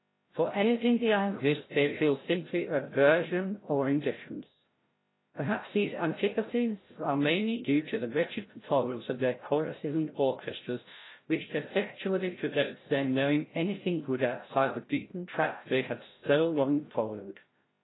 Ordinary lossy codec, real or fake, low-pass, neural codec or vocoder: AAC, 16 kbps; fake; 7.2 kHz; codec, 16 kHz, 0.5 kbps, FreqCodec, larger model